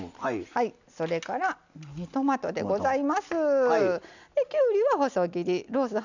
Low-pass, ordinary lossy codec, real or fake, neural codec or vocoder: 7.2 kHz; none; real; none